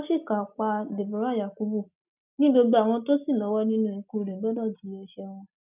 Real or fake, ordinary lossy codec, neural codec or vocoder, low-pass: real; none; none; 3.6 kHz